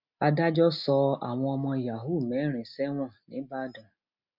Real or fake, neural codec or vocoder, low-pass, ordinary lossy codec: real; none; 5.4 kHz; none